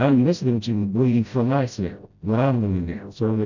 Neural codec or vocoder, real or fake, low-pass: codec, 16 kHz, 0.5 kbps, FreqCodec, smaller model; fake; 7.2 kHz